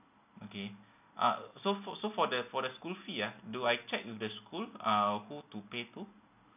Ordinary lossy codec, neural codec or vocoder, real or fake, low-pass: none; none; real; 3.6 kHz